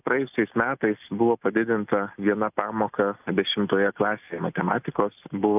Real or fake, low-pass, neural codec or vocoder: real; 3.6 kHz; none